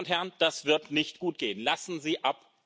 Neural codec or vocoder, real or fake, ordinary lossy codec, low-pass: none; real; none; none